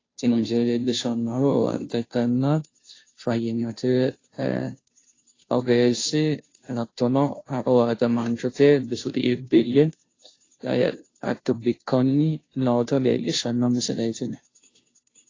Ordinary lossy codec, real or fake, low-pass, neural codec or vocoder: AAC, 32 kbps; fake; 7.2 kHz; codec, 16 kHz, 0.5 kbps, FunCodec, trained on Chinese and English, 25 frames a second